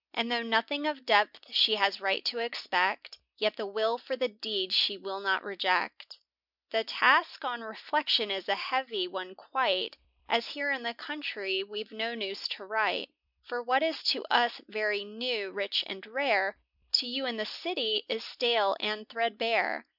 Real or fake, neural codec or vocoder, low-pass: real; none; 5.4 kHz